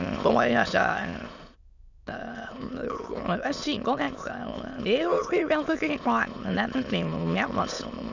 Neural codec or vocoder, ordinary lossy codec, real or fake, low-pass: autoencoder, 22.05 kHz, a latent of 192 numbers a frame, VITS, trained on many speakers; none; fake; 7.2 kHz